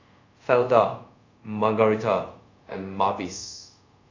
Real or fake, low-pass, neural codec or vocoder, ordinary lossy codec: fake; 7.2 kHz; codec, 24 kHz, 0.5 kbps, DualCodec; AAC, 48 kbps